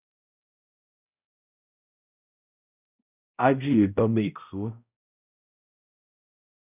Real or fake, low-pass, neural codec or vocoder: fake; 3.6 kHz; codec, 16 kHz, 0.5 kbps, X-Codec, HuBERT features, trained on balanced general audio